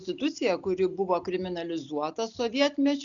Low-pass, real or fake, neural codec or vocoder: 7.2 kHz; real; none